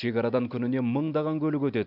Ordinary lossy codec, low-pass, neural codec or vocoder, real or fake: none; 5.4 kHz; none; real